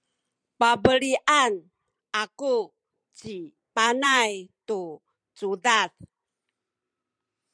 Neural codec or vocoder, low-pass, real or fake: vocoder, 44.1 kHz, 128 mel bands every 256 samples, BigVGAN v2; 9.9 kHz; fake